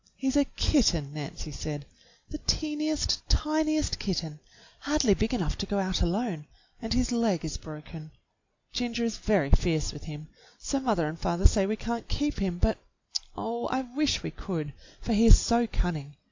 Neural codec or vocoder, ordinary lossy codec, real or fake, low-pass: none; AAC, 48 kbps; real; 7.2 kHz